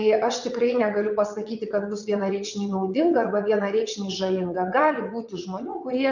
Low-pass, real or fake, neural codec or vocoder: 7.2 kHz; fake; vocoder, 22.05 kHz, 80 mel bands, WaveNeXt